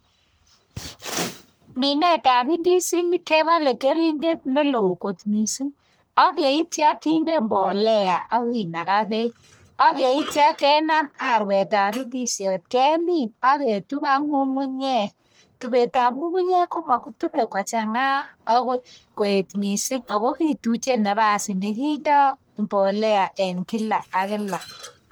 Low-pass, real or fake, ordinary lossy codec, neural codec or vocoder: none; fake; none; codec, 44.1 kHz, 1.7 kbps, Pupu-Codec